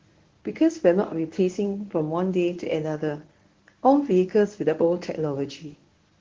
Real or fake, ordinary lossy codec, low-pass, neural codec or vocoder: fake; Opus, 16 kbps; 7.2 kHz; codec, 24 kHz, 0.9 kbps, WavTokenizer, medium speech release version 1